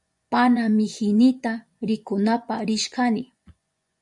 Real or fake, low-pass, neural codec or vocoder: fake; 10.8 kHz; vocoder, 24 kHz, 100 mel bands, Vocos